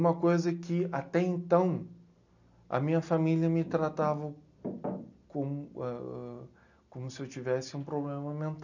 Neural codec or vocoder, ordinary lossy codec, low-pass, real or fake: none; none; 7.2 kHz; real